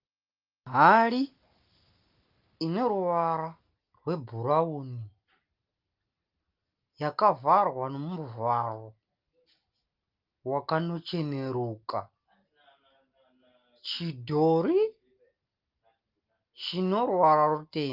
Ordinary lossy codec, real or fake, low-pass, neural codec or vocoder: Opus, 32 kbps; real; 5.4 kHz; none